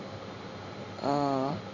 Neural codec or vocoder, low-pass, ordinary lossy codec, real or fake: codec, 16 kHz in and 24 kHz out, 1 kbps, XY-Tokenizer; 7.2 kHz; none; fake